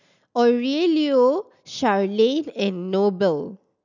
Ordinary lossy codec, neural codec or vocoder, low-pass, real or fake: none; none; 7.2 kHz; real